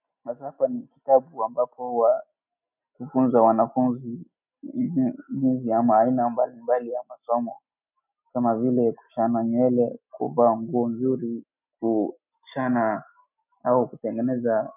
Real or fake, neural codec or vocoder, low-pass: real; none; 3.6 kHz